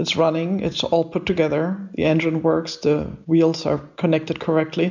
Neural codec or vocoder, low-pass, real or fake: none; 7.2 kHz; real